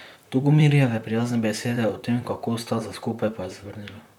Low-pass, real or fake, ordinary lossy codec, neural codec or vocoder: 19.8 kHz; fake; none; vocoder, 44.1 kHz, 128 mel bands, Pupu-Vocoder